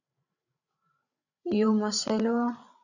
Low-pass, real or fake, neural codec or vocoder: 7.2 kHz; fake; codec, 16 kHz, 16 kbps, FreqCodec, larger model